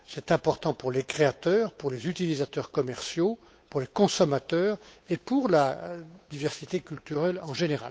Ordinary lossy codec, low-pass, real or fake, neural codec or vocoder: none; none; fake; codec, 16 kHz, 8 kbps, FunCodec, trained on Chinese and English, 25 frames a second